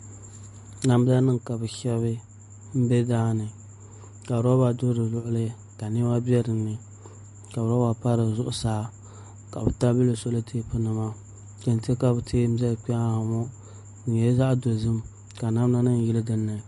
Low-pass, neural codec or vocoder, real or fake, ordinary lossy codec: 14.4 kHz; none; real; MP3, 48 kbps